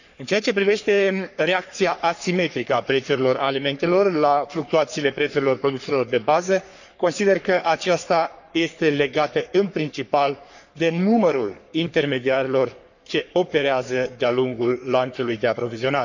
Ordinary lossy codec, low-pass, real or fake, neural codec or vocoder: none; 7.2 kHz; fake; codec, 44.1 kHz, 3.4 kbps, Pupu-Codec